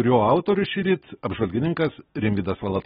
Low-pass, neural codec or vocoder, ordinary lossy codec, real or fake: 19.8 kHz; none; AAC, 16 kbps; real